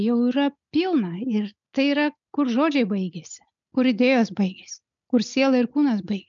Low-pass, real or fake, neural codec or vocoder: 7.2 kHz; real; none